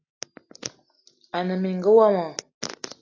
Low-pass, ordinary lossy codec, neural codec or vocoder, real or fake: 7.2 kHz; AAC, 32 kbps; none; real